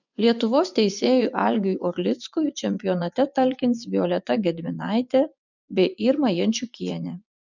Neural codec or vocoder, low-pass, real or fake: vocoder, 24 kHz, 100 mel bands, Vocos; 7.2 kHz; fake